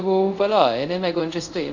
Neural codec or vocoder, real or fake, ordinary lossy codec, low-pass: codec, 24 kHz, 0.5 kbps, DualCodec; fake; none; 7.2 kHz